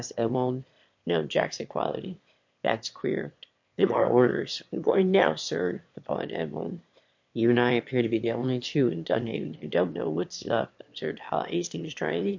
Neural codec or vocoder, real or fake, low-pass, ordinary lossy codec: autoencoder, 22.05 kHz, a latent of 192 numbers a frame, VITS, trained on one speaker; fake; 7.2 kHz; MP3, 48 kbps